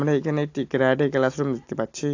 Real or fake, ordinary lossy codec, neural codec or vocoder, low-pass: real; AAC, 48 kbps; none; 7.2 kHz